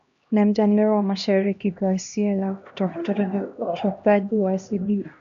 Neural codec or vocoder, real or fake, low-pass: codec, 16 kHz, 1 kbps, X-Codec, HuBERT features, trained on LibriSpeech; fake; 7.2 kHz